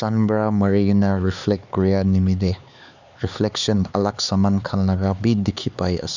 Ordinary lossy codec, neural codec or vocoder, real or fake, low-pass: none; codec, 16 kHz, 4 kbps, X-Codec, HuBERT features, trained on LibriSpeech; fake; 7.2 kHz